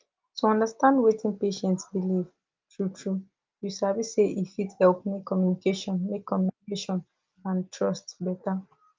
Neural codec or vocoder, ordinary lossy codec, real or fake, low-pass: none; Opus, 32 kbps; real; 7.2 kHz